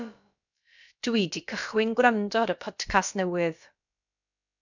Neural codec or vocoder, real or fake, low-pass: codec, 16 kHz, about 1 kbps, DyCAST, with the encoder's durations; fake; 7.2 kHz